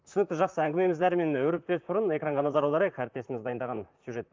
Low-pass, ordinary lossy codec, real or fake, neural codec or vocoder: 7.2 kHz; Opus, 24 kbps; fake; vocoder, 44.1 kHz, 128 mel bands, Pupu-Vocoder